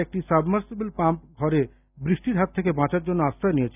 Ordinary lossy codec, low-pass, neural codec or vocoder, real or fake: none; 3.6 kHz; none; real